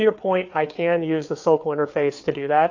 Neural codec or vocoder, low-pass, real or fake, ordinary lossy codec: codec, 16 kHz, 4 kbps, FreqCodec, larger model; 7.2 kHz; fake; AAC, 48 kbps